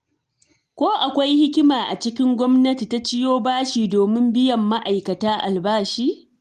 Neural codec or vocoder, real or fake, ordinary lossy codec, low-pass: none; real; Opus, 24 kbps; 14.4 kHz